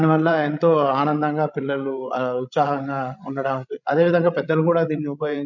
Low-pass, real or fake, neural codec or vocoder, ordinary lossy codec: 7.2 kHz; fake; codec, 16 kHz, 16 kbps, FreqCodec, larger model; MP3, 64 kbps